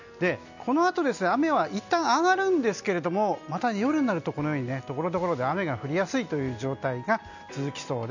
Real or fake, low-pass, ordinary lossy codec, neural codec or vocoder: real; 7.2 kHz; none; none